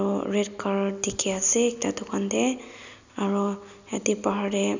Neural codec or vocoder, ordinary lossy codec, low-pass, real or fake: none; none; 7.2 kHz; real